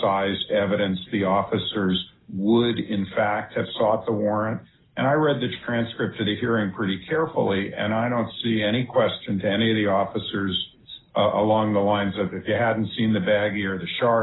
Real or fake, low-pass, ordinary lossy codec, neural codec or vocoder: real; 7.2 kHz; AAC, 16 kbps; none